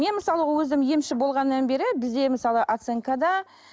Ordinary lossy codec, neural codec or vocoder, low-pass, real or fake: none; none; none; real